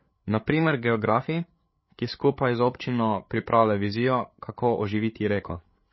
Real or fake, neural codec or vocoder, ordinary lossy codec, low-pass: fake; codec, 16 kHz, 8 kbps, FreqCodec, larger model; MP3, 24 kbps; 7.2 kHz